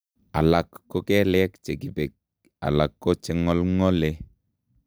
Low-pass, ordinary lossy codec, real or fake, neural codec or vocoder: none; none; real; none